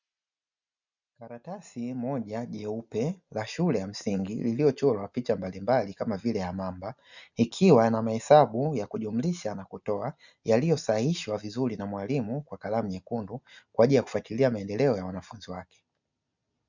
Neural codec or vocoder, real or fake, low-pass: none; real; 7.2 kHz